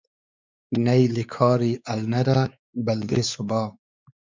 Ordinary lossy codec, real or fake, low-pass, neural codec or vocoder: MP3, 64 kbps; fake; 7.2 kHz; codec, 16 kHz, 4 kbps, X-Codec, WavLM features, trained on Multilingual LibriSpeech